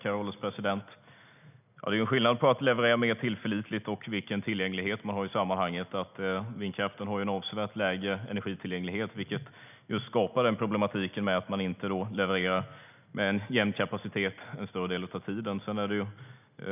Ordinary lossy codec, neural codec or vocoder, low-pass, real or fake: none; none; 3.6 kHz; real